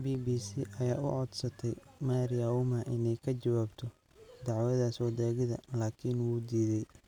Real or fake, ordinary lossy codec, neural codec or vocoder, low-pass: real; none; none; 19.8 kHz